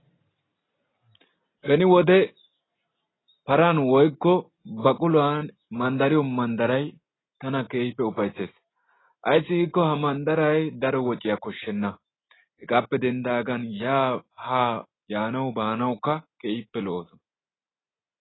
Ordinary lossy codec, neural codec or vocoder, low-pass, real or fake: AAC, 16 kbps; none; 7.2 kHz; real